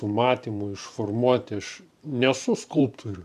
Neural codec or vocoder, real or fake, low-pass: vocoder, 44.1 kHz, 128 mel bands every 512 samples, BigVGAN v2; fake; 14.4 kHz